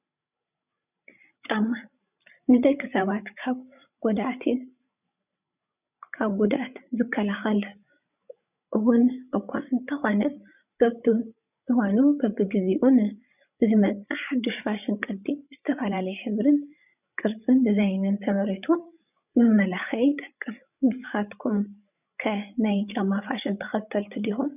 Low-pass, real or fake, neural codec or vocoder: 3.6 kHz; fake; codec, 16 kHz, 16 kbps, FreqCodec, larger model